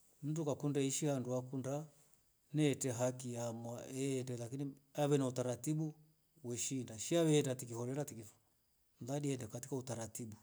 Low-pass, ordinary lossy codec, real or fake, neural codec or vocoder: none; none; real; none